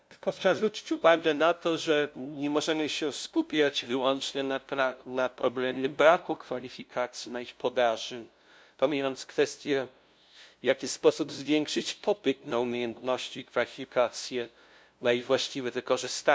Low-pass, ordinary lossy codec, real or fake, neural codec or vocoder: none; none; fake; codec, 16 kHz, 0.5 kbps, FunCodec, trained on LibriTTS, 25 frames a second